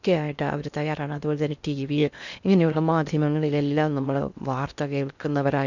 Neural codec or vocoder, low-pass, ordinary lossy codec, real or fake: codec, 16 kHz in and 24 kHz out, 0.6 kbps, FocalCodec, streaming, 2048 codes; 7.2 kHz; none; fake